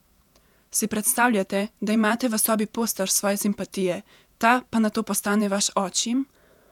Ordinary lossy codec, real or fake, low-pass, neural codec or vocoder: none; fake; 19.8 kHz; vocoder, 48 kHz, 128 mel bands, Vocos